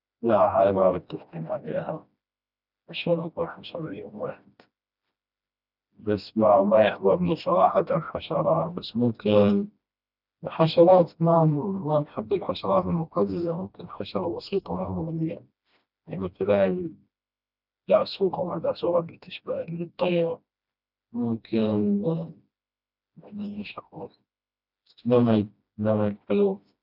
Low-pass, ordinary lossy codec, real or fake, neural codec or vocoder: 5.4 kHz; none; fake; codec, 16 kHz, 1 kbps, FreqCodec, smaller model